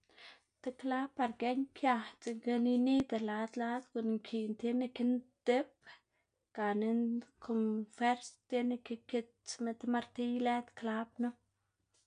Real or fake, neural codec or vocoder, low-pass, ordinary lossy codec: real; none; 9.9 kHz; none